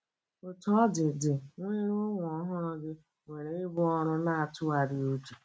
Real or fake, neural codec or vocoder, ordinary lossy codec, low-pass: real; none; none; none